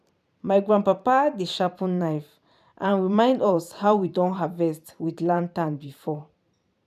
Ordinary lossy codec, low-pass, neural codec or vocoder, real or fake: none; 14.4 kHz; vocoder, 44.1 kHz, 128 mel bands every 512 samples, BigVGAN v2; fake